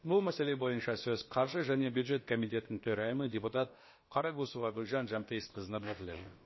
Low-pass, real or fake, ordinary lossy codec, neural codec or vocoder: 7.2 kHz; fake; MP3, 24 kbps; codec, 16 kHz, about 1 kbps, DyCAST, with the encoder's durations